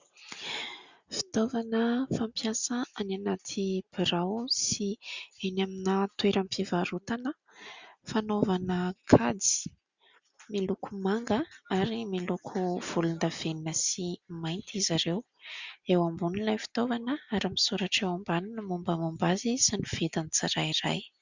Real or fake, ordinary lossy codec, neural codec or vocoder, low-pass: real; Opus, 64 kbps; none; 7.2 kHz